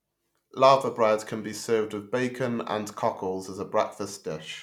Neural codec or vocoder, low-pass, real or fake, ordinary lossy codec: none; 19.8 kHz; real; none